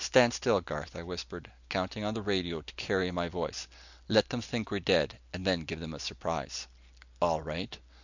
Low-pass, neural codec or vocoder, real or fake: 7.2 kHz; none; real